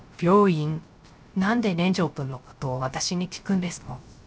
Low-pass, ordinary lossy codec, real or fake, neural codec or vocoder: none; none; fake; codec, 16 kHz, 0.3 kbps, FocalCodec